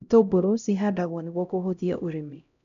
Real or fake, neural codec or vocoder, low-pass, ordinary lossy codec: fake; codec, 16 kHz, 0.5 kbps, X-Codec, HuBERT features, trained on LibriSpeech; 7.2 kHz; none